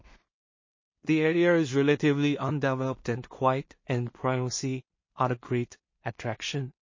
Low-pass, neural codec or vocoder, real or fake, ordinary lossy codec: 7.2 kHz; codec, 16 kHz in and 24 kHz out, 0.4 kbps, LongCat-Audio-Codec, two codebook decoder; fake; MP3, 32 kbps